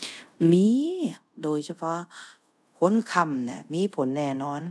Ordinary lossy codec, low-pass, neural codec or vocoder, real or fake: none; none; codec, 24 kHz, 0.5 kbps, DualCodec; fake